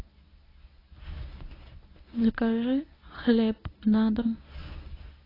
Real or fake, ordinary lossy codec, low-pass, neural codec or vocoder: fake; AAC, 24 kbps; 5.4 kHz; codec, 24 kHz, 0.9 kbps, WavTokenizer, medium speech release version 1